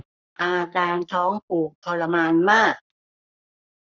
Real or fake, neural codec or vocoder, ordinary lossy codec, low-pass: fake; codec, 44.1 kHz, 3.4 kbps, Pupu-Codec; none; 7.2 kHz